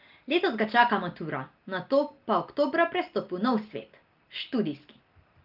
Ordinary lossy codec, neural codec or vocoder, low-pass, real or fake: Opus, 32 kbps; none; 5.4 kHz; real